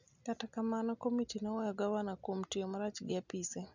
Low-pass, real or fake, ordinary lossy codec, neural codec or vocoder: 7.2 kHz; real; none; none